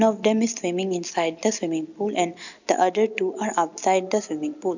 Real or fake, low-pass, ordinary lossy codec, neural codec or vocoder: real; 7.2 kHz; none; none